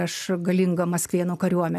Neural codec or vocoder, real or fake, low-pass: none; real; 14.4 kHz